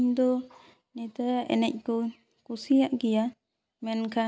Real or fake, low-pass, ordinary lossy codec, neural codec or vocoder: real; none; none; none